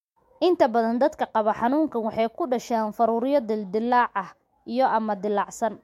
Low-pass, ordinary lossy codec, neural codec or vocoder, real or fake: 19.8 kHz; MP3, 64 kbps; autoencoder, 48 kHz, 128 numbers a frame, DAC-VAE, trained on Japanese speech; fake